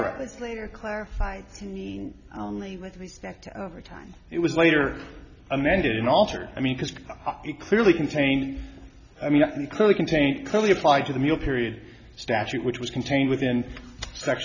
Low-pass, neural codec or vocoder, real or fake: 7.2 kHz; none; real